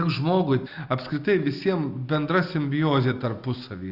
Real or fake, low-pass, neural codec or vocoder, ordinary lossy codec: real; 5.4 kHz; none; Opus, 64 kbps